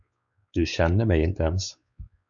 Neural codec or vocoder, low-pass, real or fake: codec, 16 kHz, 4 kbps, X-Codec, WavLM features, trained on Multilingual LibriSpeech; 7.2 kHz; fake